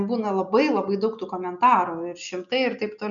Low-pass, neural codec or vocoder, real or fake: 7.2 kHz; none; real